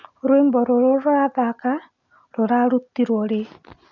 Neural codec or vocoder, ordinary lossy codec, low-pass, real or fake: none; none; 7.2 kHz; real